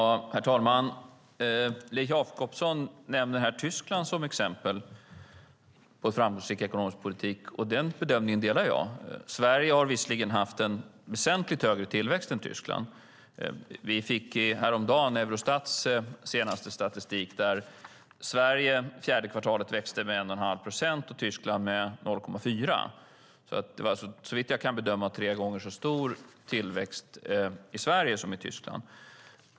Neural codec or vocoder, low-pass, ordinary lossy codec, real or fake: none; none; none; real